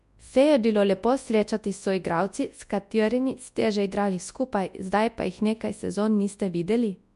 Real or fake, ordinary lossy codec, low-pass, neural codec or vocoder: fake; MP3, 64 kbps; 10.8 kHz; codec, 24 kHz, 0.9 kbps, WavTokenizer, large speech release